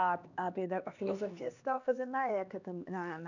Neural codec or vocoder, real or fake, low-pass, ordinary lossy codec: codec, 16 kHz, 2 kbps, X-Codec, HuBERT features, trained on LibriSpeech; fake; 7.2 kHz; none